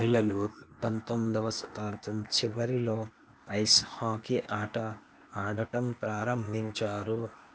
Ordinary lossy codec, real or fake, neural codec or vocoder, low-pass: none; fake; codec, 16 kHz, 0.8 kbps, ZipCodec; none